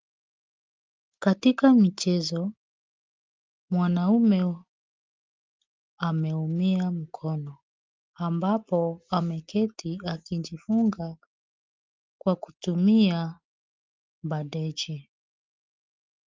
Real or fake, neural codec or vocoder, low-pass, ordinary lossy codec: real; none; 7.2 kHz; Opus, 32 kbps